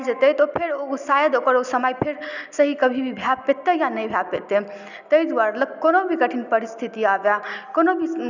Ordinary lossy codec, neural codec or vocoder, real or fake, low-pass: none; none; real; 7.2 kHz